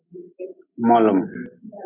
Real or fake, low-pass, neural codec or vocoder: real; 3.6 kHz; none